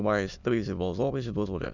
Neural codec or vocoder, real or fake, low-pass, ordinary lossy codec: autoencoder, 22.05 kHz, a latent of 192 numbers a frame, VITS, trained on many speakers; fake; 7.2 kHz; none